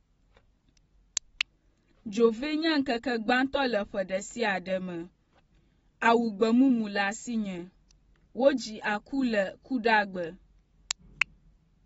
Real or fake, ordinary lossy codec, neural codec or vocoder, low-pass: real; AAC, 24 kbps; none; 19.8 kHz